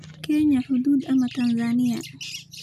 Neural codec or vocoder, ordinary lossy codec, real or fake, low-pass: none; none; real; 14.4 kHz